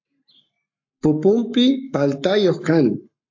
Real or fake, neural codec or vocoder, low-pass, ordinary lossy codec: fake; codec, 44.1 kHz, 7.8 kbps, Pupu-Codec; 7.2 kHz; AAC, 48 kbps